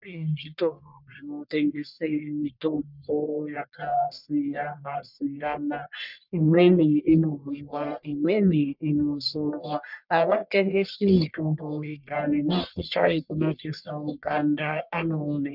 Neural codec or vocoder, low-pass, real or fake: codec, 44.1 kHz, 1.7 kbps, Pupu-Codec; 5.4 kHz; fake